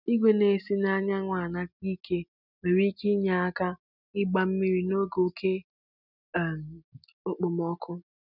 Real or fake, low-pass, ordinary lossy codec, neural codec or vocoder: real; 5.4 kHz; none; none